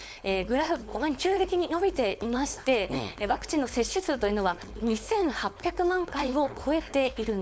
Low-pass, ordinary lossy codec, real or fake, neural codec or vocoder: none; none; fake; codec, 16 kHz, 4.8 kbps, FACodec